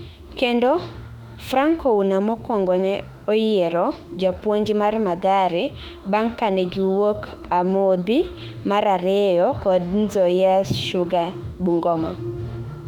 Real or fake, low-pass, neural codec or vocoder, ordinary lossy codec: fake; 19.8 kHz; autoencoder, 48 kHz, 32 numbers a frame, DAC-VAE, trained on Japanese speech; none